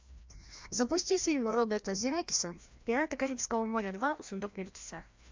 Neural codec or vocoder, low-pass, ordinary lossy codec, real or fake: codec, 16 kHz, 1 kbps, FreqCodec, larger model; 7.2 kHz; MP3, 64 kbps; fake